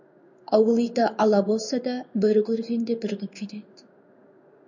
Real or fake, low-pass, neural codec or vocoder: fake; 7.2 kHz; codec, 16 kHz in and 24 kHz out, 1 kbps, XY-Tokenizer